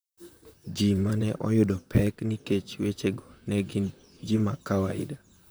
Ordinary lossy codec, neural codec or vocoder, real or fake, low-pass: none; vocoder, 44.1 kHz, 128 mel bands, Pupu-Vocoder; fake; none